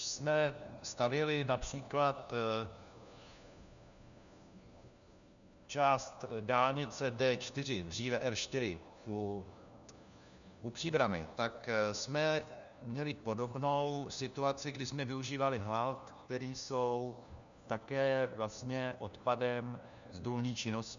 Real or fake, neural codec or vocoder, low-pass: fake; codec, 16 kHz, 1 kbps, FunCodec, trained on LibriTTS, 50 frames a second; 7.2 kHz